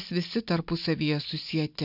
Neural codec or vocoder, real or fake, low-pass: none; real; 5.4 kHz